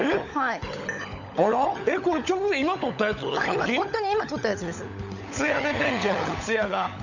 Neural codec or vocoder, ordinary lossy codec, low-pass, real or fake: codec, 16 kHz, 16 kbps, FunCodec, trained on LibriTTS, 50 frames a second; none; 7.2 kHz; fake